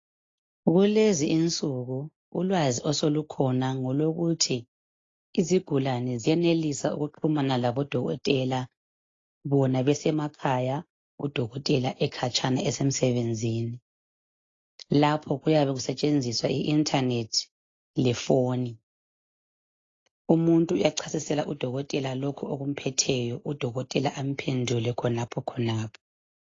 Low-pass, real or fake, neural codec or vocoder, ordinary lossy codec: 7.2 kHz; real; none; AAC, 32 kbps